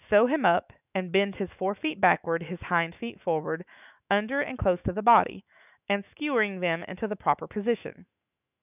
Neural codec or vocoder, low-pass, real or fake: autoencoder, 48 kHz, 128 numbers a frame, DAC-VAE, trained on Japanese speech; 3.6 kHz; fake